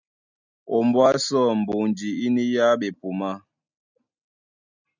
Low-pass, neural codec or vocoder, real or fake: 7.2 kHz; none; real